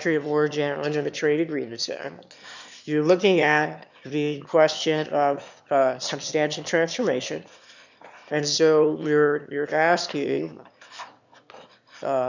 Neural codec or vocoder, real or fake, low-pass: autoencoder, 22.05 kHz, a latent of 192 numbers a frame, VITS, trained on one speaker; fake; 7.2 kHz